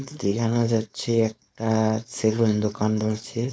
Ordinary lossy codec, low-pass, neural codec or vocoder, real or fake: none; none; codec, 16 kHz, 4.8 kbps, FACodec; fake